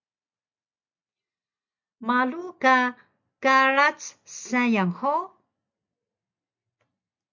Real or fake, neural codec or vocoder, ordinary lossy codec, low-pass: real; none; MP3, 64 kbps; 7.2 kHz